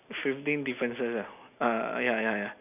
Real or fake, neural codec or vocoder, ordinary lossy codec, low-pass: real; none; none; 3.6 kHz